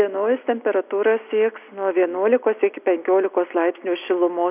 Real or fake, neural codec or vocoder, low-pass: real; none; 3.6 kHz